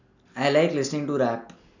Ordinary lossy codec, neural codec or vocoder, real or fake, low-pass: none; none; real; 7.2 kHz